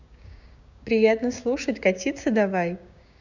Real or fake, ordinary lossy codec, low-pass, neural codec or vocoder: fake; none; 7.2 kHz; codec, 16 kHz, 6 kbps, DAC